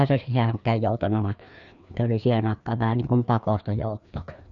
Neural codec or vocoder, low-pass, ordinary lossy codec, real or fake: codec, 16 kHz, 2 kbps, FreqCodec, larger model; 7.2 kHz; none; fake